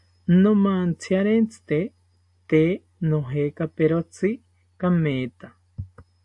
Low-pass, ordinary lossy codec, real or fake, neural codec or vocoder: 10.8 kHz; AAC, 64 kbps; real; none